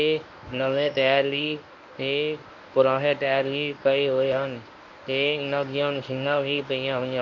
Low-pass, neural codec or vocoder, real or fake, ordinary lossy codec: 7.2 kHz; codec, 24 kHz, 0.9 kbps, WavTokenizer, medium speech release version 1; fake; MP3, 48 kbps